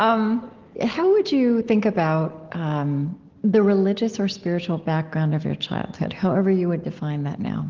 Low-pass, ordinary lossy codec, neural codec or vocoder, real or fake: 7.2 kHz; Opus, 16 kbps; none; real